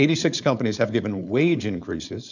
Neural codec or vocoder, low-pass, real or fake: codec, 16 kHz, 4.8 kbps, FACodec; 7.2 kHz; fake